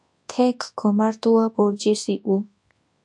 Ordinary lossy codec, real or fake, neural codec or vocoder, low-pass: AAC, 64 kbps; fake; codec, 24 kHz, 0.9 kbps, WavTokenizer, large speech release; 10.8 kHz